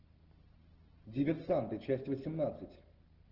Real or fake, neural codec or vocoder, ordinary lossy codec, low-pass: real; none; Opus, 16 kbps; 5.4 kHz